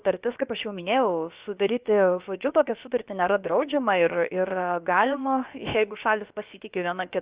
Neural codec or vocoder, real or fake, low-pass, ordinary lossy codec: codec, 16 kHz, about 1 kbps, DyCAST, with the encoder's durations; fake; 3.6 kHz; Opus, 64 kbps